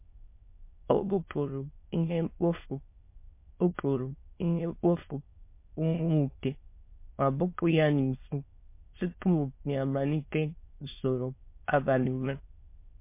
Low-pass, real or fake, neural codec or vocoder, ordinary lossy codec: 3.6 kHz; fake; autoencoder, 22.05 kHz, a latent of 192 numbers a frame, VITS, trained on many speakers; MP3, 24 kbps